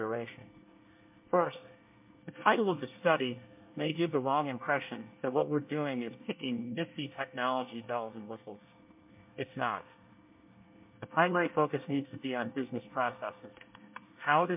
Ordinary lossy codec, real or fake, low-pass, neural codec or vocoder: MP3, 32 kbps; fake; 3.6 kHz; codec, 24 kHz, 1 kbps, SNAC